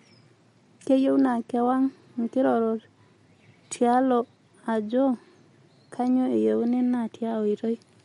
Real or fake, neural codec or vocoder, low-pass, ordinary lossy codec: real; none; 19.8 kHz; MP3, 48 kbps